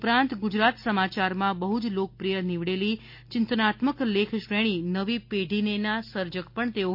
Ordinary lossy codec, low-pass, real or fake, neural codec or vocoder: MP3, 32 kbps; 5.4 kHz; real; none